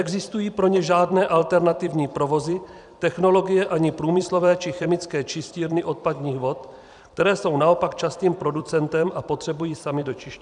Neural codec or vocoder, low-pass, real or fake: vocoder, 44.1 kHz, 128 mel bands every 256 samples, BigVGAN v2; 10.8 kHz; fake